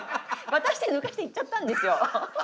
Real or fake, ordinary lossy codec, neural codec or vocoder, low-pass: real; none; none; none